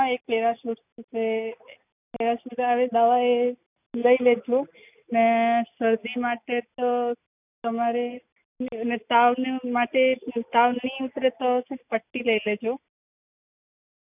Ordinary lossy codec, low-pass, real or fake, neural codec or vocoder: none; 3.6 kHz; real; none